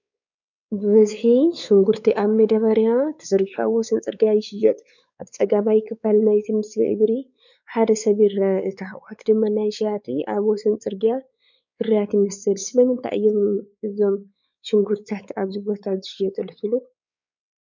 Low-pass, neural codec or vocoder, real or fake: 7.2 kHz; codec, 16 kHz, 4 kbps, X-Codec, WavLM features, trained on Multilingual LibriSpeech; fake